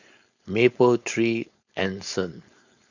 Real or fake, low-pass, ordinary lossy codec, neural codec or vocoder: fake; 7.2 kHz; none; codec, 16 kHz, 4.8 kbps, FACodec